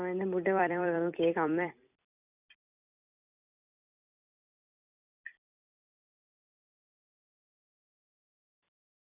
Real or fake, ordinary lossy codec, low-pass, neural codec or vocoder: fake; none; 3.6 kHz; vocoder, 44.1 kHz, 128 mel bands every 256 samples, BigVGAN v2